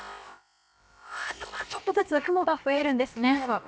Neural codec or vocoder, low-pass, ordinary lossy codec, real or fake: codec, 16 kHz, about 1 kbps, DyCAST, with the encoder's durations; none; none; fake